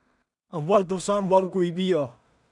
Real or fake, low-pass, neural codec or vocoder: fake; 10.8 kHz; codec, 16 kHz in and 24 kHz out, 0.4 kbps, LongCat-Audio-Codec, two codebook decoder